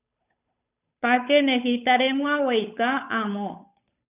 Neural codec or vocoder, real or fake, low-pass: codec, 16 kHz, 8 kbps, FunCodec, trained on Chinese and English, 25 frames a second; fake; 3.6 kHz